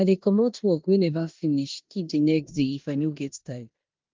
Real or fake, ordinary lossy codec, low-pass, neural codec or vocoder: fake; Opus, 32 kbps; 7.2 kHz; codec, 16 kHz in and 24 kHz out, 0.9 kbps, LongCat-Audio-Codec, four codebook decoder